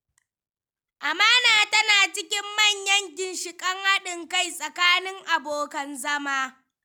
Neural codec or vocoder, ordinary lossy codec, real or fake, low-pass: none; none; real; none